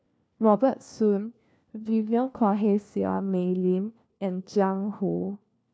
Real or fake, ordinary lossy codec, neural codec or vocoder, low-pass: fake; none; codec, 16 kHz, 1 kbps, FunCodec, trained on LibriTTS, 50 frames a second; none